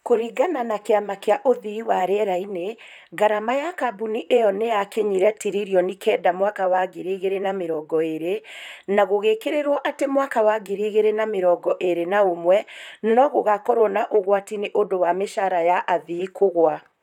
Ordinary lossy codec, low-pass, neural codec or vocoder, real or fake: none; 19.8 kHz; vocoder, 44.1 kHz, 128 mel bands, Pupu-Vocoder; fake